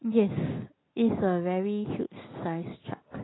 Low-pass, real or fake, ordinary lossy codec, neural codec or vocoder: 7.2 kHz; real; AAC, 16 kbps; none